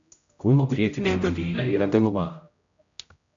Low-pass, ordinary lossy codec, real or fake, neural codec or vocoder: 7.2 kHz; AAC, 64 kbps; fake; codec, 16 kHz, 0.5 kbps, X-Codec, HuBERT features, trained on general audio